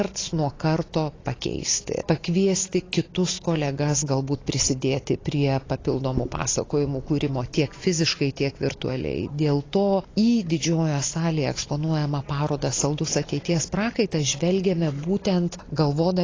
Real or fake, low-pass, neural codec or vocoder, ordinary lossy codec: real; 7.2 kHz; none; AAC, 32 kbps